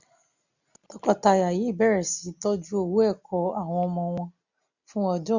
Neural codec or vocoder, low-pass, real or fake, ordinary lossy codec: none; 7.2 kHz; real; none